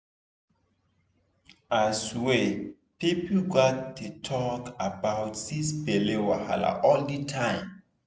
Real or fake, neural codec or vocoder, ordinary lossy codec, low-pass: real; none; none; none